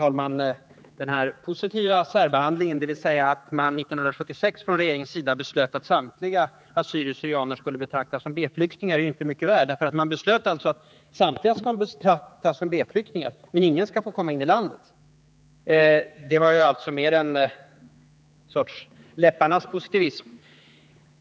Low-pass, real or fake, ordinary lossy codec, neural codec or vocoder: none; fake; none; codec, 16 kHz, 4 kbps, X-Codec, HuBERT features, trained on general audio